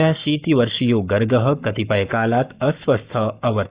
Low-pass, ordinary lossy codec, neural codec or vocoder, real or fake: 3.6 kHz; Opus, 64 kbps; codec, 44.1 kHz, 7.8 kbps, Pupu-Codec; fake